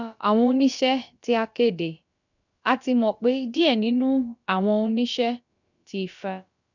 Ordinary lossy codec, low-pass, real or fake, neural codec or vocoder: none; 7.2 kHz; fake; codec, 16 kHz, about 1 kbps, DyCAST, with the encoder's durations